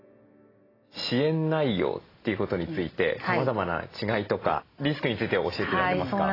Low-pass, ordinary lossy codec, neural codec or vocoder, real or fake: 5.4 kHz; AAC, 24 kbps; none; real